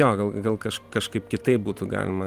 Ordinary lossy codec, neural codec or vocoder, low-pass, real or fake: Opus, 24 kbps; vocoder, 44.1 kHz, 128 mel bands every 512 samples, BigVGAN v2; 14.4 kHz; fake